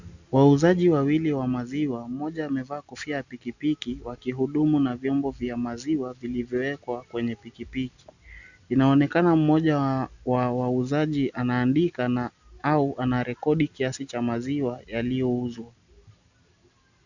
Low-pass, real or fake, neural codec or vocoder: 7.2 kHz; real; none